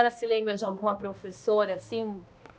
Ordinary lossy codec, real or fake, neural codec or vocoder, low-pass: none; fake; codec, 16 kHz, 1 kbps, X-Codec, HuBERT features, trained on balanced general audio; none